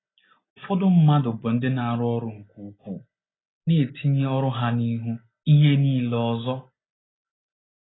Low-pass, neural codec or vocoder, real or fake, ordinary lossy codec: 7.2 kHz; none; real; AAC, 16 kbps